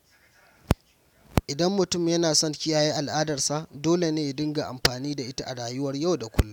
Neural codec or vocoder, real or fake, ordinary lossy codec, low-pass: none; real; none; 19.8 kHz